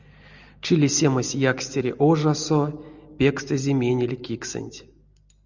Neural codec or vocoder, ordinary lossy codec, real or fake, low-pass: none; Opus, 64 kbps; real; 7.2 kHz